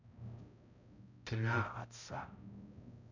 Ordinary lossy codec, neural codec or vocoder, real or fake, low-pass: none; codec, 16 kHz, 0.5 kbps, X-Codec, HuBERT features, trained on balanced general audio; fake; 7.2 kHz